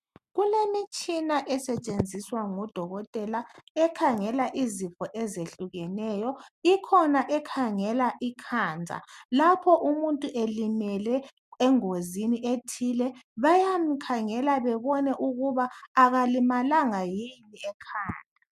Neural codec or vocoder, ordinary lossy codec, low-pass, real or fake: none; MP3, 96 kbps; 14.4 kHz; real